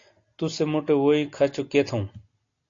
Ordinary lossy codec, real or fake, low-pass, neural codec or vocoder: AAC, 48 kbps; real; 7.2 kHz; none